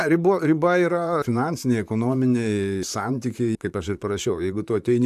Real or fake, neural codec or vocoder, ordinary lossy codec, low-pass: fake; vocoder, 44.1 kHz, 128 mel bands, Pupu-Vocoder; AAC, 96 kbps; 14.4 kHz